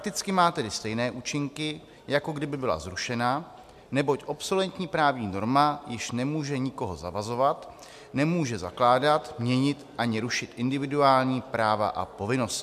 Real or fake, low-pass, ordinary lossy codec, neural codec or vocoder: real; 14.4 kHz; MP3, 96 kbps; none